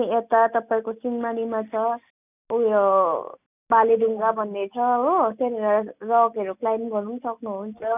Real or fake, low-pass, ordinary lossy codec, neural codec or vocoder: real; 3.6 kHz; none; none